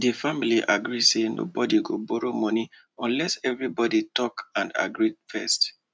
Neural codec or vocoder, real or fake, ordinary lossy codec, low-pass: none; real; none; none